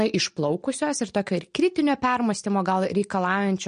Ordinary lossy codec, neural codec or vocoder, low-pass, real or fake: MP3, 48 kbps; none; 9.9 kHz; real